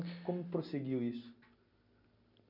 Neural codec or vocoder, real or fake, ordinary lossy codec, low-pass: none; real; none; 5.4 kHz